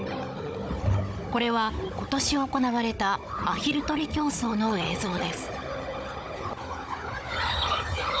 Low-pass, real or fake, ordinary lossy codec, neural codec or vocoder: none; fake; none; codec, 16 kHz, 16 kbps, FunCodec, trained on Chinese and English, 50 frames a second